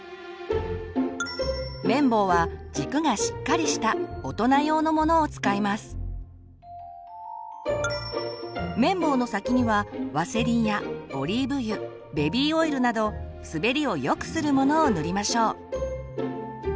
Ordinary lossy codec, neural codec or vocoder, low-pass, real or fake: none; none; none; real